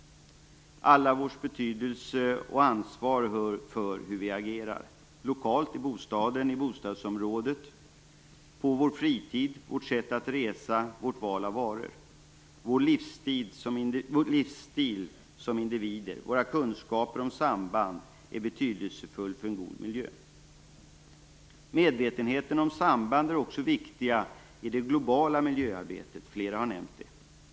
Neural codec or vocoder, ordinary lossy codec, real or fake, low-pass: none; none; real; none